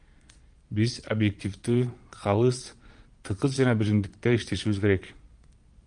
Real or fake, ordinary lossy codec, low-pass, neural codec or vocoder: fake; Opus, 32 kbps; 9.9 kHz; vocoder, 22.05 kHz, 80 mel bands, Vocos